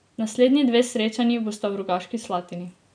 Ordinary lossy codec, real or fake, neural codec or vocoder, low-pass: none; real; none; 9.9 kHz